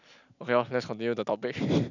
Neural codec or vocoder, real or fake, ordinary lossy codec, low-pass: none; real; none; 7.2 kHz